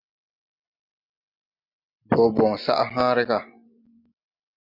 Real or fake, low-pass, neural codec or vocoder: real; 5.4 kHz; none